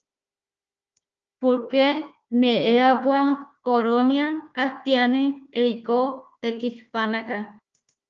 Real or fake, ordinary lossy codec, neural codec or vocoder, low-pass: fake; Opus, 24 kbps; codec, 16 kHz, 1 kbps, FunCodec, trained on Chinese and English, 50 frames a second; 7.2 kHz